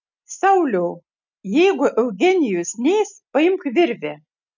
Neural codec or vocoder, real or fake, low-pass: none; real; 7.2 kHz